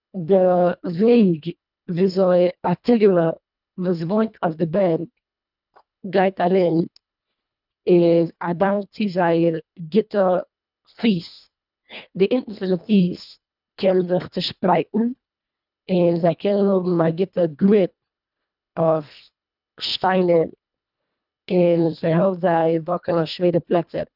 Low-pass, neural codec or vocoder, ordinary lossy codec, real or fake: 5.4 kHz; codec, 24 kHz, 1.5 kbps, HILCodec; none; fake